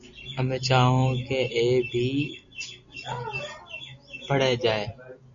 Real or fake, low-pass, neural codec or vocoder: real; 7.2 kHz; none